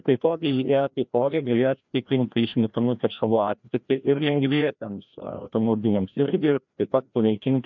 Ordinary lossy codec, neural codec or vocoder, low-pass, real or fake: MP3, 64 kbps; codec, 16 kHz, 1 kbps, FreqCodec, larger model; 7.2 kHz; fake